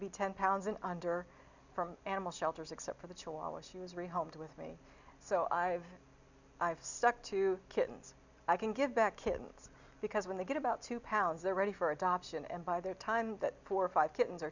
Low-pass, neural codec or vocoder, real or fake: 7.2 kHz; none; real